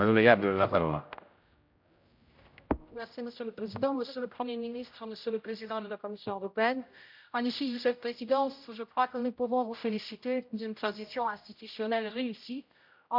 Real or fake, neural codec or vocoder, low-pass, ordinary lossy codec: fake; codec, 16 kHz, 0.5 kbps, X-Codec, HuBERT features, trained on general audio; 5.4 kHz; MP3, 48 kbps